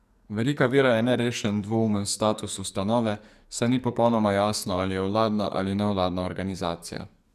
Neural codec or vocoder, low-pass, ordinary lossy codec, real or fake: codec, 44.1 kHz, 2.6 kbps, SNAC; 14.4 kHz; none; fake